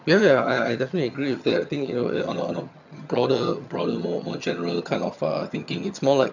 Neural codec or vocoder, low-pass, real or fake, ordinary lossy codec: vocoder, 22.05 kHz, 80 mel bands, HiFi-GAN; 7.2 kHz; fake; none